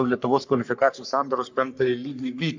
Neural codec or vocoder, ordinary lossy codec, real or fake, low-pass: codec, 44.1 kHz, 3.4 kbps, Pupu-Codec; MP3, 64 kbps; fake; 7.2 kHz